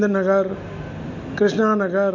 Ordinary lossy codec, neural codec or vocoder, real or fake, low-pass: MP3, 48 kbps; none; real; 7.2 kHz